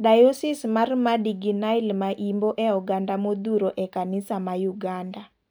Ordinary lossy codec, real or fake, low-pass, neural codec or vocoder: none; real; none; none